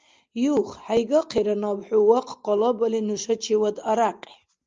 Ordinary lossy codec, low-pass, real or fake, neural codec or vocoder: Opus, 24 kbps; 7.2 kHz; real; none